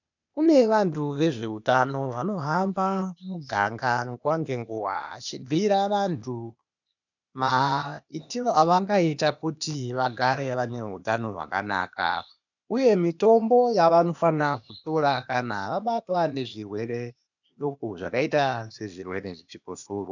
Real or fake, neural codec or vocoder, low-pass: fake; codec, 16 kHz, 0.8 kbps, ZipCodec; 7.2 kHz